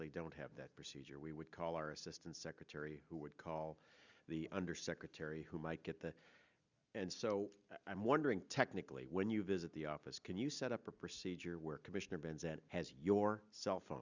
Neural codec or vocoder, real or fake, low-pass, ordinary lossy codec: none; real; 7.2 kHz; Opus, 64 kbps